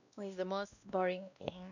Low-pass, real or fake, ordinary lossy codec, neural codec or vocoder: 7.2 kHz; fake; none; codec, 16 kHz, 1 kbps, X-Codec, WavLM features, trained on Multilingual LibriSpeech